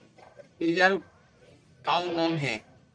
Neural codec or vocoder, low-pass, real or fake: codec, 44.1 kHz, 1.7 kbps, Pupu-Codec; 9.9 kHz; fake